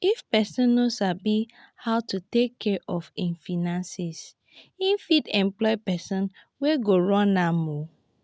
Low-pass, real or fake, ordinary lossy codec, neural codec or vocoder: none; real; none; none